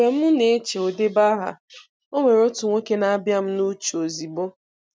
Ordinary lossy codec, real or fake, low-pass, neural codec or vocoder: none; real; none; none